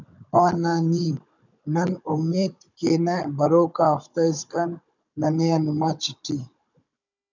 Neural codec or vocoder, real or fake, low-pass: codec, 16 kHz, 16 kbps, FunCodec, trained on Chinese and English, 50 frames a second; fake; 7.2 kHz